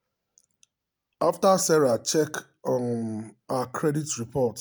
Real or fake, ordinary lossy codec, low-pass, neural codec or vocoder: real; none; none; none